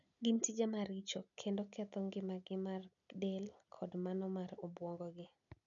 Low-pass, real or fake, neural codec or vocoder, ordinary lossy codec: 7.2 kHz; real; none; none